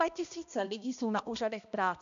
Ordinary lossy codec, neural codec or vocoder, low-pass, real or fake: AAC, 96 kbps; codec, 16 kHz, 1 kbps, X-Codec, HuBERT features, trained on balanced general audio; 7.2 kHz; fake